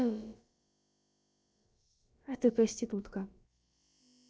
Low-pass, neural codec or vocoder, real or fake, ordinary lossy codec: none; codec, 16 kHz, about 1 kbps, DyCAST, with the encoder's durations; fake; none